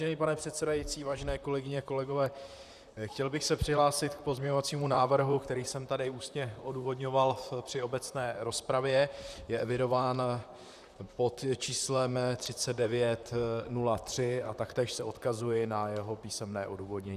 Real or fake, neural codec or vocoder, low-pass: fake; vocoder, 44.1 kHz, 128 mel bands, Pupu-Vocoder; 14.4 kHz